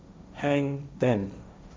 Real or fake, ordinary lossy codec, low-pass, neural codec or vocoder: fake; none; none; codec, 16 kHz, 1.1 kbps, Voila-Tokenizer